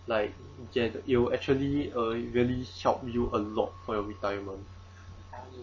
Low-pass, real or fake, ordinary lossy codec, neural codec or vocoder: 7.2 kHz; real; MP3, 32 kbps; none